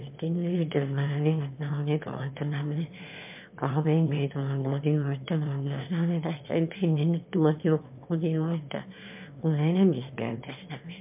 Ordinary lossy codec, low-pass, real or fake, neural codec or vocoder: MP3, 32 kbps; 3.6 kHz; fake; autoencoder, 22.05 kHz, a latent of 192 numbers a frame, VITS, trained on one speaker